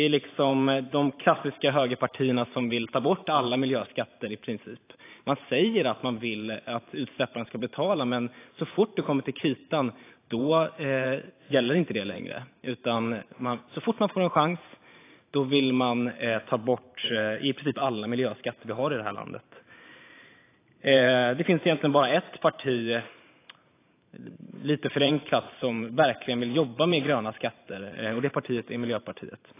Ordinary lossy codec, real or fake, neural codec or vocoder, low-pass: AAC, 24 kbps; fake; vocoder, 44.1 kHz, 128 mel bands every 512 samples, BigVGAN v2; 3.6 kHz